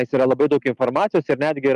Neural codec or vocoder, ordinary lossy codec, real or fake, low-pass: none; Opus, 32 kbps; real; 10.8 kHz